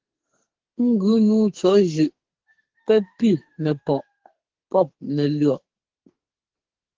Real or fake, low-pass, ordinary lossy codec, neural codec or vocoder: fake; 7.2 kHz; Opus, 16 kbps; codec, 44.1 kHz, 2.6 kbps, SNAC